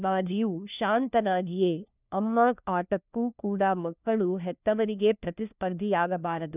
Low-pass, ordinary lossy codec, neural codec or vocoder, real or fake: 3.6 kHz; none; codec, 16 kHz, 1 kbps, FunCodec, trained on LibriTTS, 50 frames a second; fake